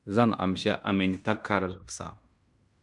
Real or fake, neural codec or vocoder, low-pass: fake; codec, 16 kHz in and 24 kHz out, 0.9 kbps, LongCat-Audio-Codec, fine tuned four codebook decoder; 10.8 kHz